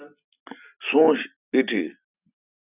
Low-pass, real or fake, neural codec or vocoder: 3.6 kHz; real; none